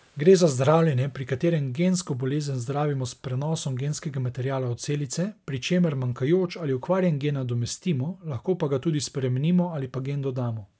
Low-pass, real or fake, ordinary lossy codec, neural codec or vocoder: none; real; none; none